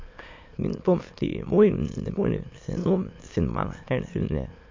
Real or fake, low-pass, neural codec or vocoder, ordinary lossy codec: fake; 7.2 kHz; autoencoder, 22.05 kHz, a latent of 192 numbers a frame, VITS, trained on many speakers; MP3, 48 kbps